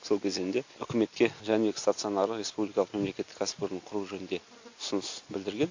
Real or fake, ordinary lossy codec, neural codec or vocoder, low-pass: real; AAC, 48 kbps; none; 7.2 kHz